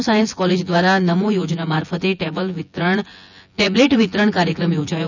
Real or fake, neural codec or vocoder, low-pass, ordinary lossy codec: fake; vocoder, 24 kHz, 100 mel bands, Vocos; 7.2 kHz; none